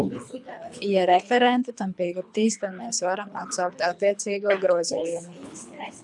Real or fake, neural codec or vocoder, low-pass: fake; codec, 24 kHz, 3 kbps, HILCodec; 10.8 kHz